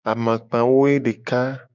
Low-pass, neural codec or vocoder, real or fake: 7.2 kHz; codec, 16 kHz, 6 kbps, DAC; fake